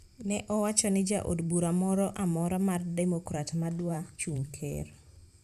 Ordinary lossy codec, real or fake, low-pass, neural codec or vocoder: none; fake; 14.4 kHz; vocoder, 44.1 kHz, 128 mel bands every 512 samples, BigVGAN v2